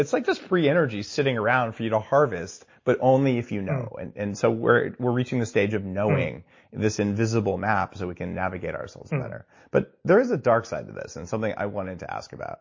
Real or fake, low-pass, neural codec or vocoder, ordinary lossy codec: real; 7.2 kHz; none; MP3, 32 kbps